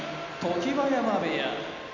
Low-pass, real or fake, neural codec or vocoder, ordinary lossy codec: 7.2 kHz; real; none; none